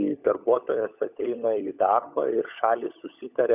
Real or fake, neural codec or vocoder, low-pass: fake; codec, 16 kHz, 16 kbps, FunCodec, trained on LibriTTS, 50 frames a second; 3.6 kHz